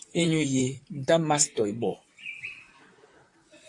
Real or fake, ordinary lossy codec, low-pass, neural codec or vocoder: fake; AAC, 48 kbps; 10.8 kHz; vocoder, 44.1 kHz, 128 mel bands, Pupu-Vocoder